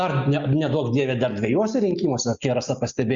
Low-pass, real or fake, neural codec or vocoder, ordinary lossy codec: 7.2 kHz; real; none; Opus, 64 kbps